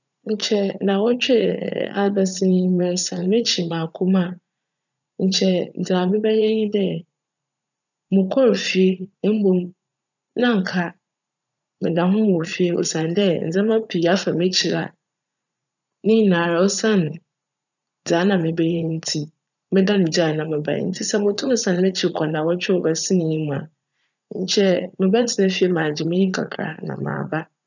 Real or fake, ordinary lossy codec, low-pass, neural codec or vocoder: fake; none; 7.2 kHz; vocoder, 44.1 kHz, 80 mel bands, Vocos